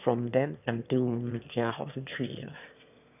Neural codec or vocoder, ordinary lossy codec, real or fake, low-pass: autoencoder, 22.05 kHz, a latent of 192 numbers a frame, VITS, trained on one speaker; none; fake; 3.6 kHz